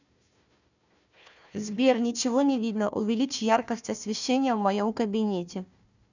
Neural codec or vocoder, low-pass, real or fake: codec, 16 kHz, 1 kbps, FunCodec, trained on Chinese and English, 50 frames a second; 7.2 kHz; fake